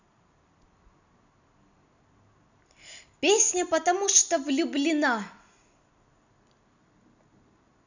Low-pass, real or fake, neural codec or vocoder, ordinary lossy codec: 7.2 kHz; real; none; none